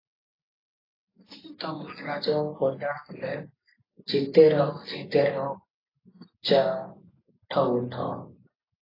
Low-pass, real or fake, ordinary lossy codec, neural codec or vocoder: 5.4 kHz; real; AAC, 24 kbps; none